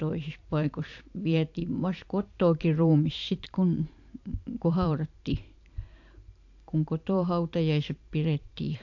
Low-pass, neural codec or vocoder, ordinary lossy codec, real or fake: 7.2 kHz; vocoder, 44.1 kHz, 128 mel bands every 512 samples, BigVGAN v2; AAC, 48 kbps; fake